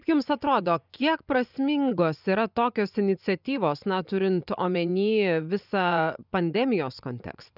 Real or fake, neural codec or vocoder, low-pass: fake; vocoder, 24 kHz, 100 mel bands, Vocos; 5.4 kHz